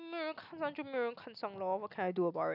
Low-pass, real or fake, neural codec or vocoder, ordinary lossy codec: 5.4 kHz; real; none; none